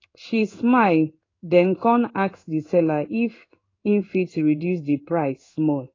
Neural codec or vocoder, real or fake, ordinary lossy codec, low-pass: codec, 16 kHz in and 24 kHz out, 1 kbps, XY-Tokenizer; fake; AAC, 32 kbps; 7.2 kHz